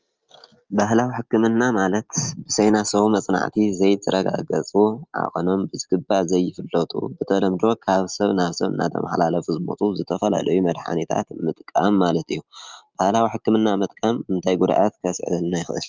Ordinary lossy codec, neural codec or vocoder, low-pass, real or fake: Opus, 24 kbps; none; 7.2 kHz; real